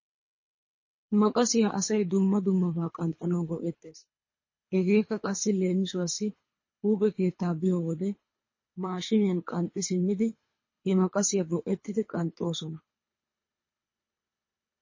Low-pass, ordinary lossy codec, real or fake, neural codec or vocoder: 7.2 kHz; MP3, 32 kbps; fake; codec, 24 kHz, 3 kbps, HILCodec